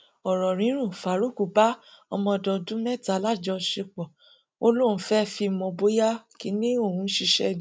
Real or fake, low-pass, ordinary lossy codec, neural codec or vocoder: real; none; none; none